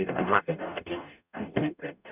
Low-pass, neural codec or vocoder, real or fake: 3.6 kHz; codec, 44.1 kHz, 0.9 kbps, DAC; fake